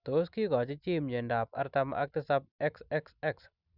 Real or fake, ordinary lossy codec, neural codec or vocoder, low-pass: real; none; none; 5.4 kHz